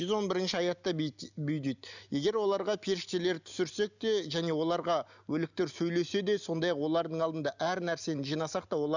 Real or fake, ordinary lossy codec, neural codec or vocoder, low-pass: real; none; none; 7.2 kHz